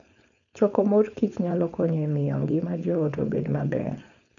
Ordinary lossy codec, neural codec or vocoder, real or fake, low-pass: none; codec, 16 kHz, 4.8 kbps, FACodec; fake; 7.2 kHz